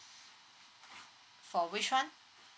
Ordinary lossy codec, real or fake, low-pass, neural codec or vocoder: none; real; none; none